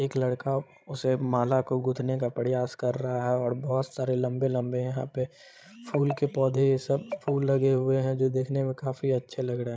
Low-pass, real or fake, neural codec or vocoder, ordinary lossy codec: none; fake; codec, 16 kHz, 16 kbps, FreqCodec, larger model; none